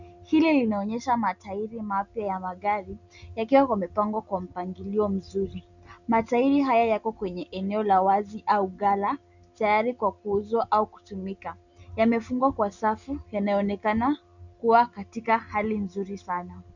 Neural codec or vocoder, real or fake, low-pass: none; real; 7.2 kHz